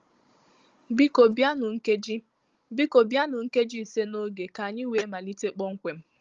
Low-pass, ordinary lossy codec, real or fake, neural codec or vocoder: 7.2 kHz; Opus, 32 kbps; fake; codec, 16 kHz, 16 kbps, FunCodec, trained on Chinese and English, 50 frames a second